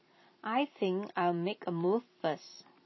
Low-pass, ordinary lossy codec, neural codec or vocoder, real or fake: 7.2 kHz; MP3, 24 kbps; vocoder, 44.1 kHz, 128 mel bands every 256 samples, BigVGAN v2; fake